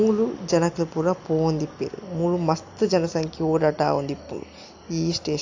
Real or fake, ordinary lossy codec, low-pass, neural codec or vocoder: real; AAC, 48 kbps; 7.2 kHz; none